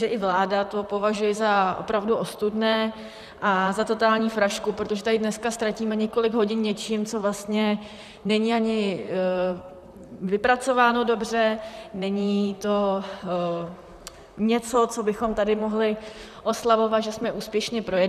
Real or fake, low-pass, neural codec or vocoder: fake; 14.4 kHz; vocoder, 44.1 kHz, 128 mel bands, Pupu-Vocoder